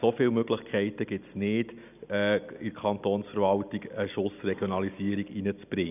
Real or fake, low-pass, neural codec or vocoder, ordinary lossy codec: real; 3.6 kHz; none; none